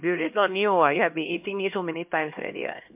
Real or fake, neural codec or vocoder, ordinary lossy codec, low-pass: fake; codec, 16 kHz, 1 kbps, X-Codec, HuBERT features, trained on LibriSpeech; MP3, 32 kbps; 3.6 kHz